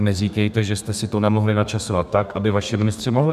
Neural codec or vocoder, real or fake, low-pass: codec, 32 kHz, 1.9 kbps, SNAC; fake; 14.4 kHz